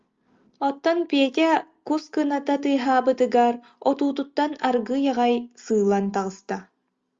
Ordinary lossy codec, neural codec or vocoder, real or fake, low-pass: Opus, 32 kbps; none; real; 7.2 kHz